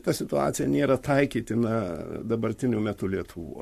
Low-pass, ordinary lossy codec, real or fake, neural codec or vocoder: 14.4 kHz; MP3, 64 kbps; fake; codec, 44.1 kHz, 7.8 kbps, Pupu-Codec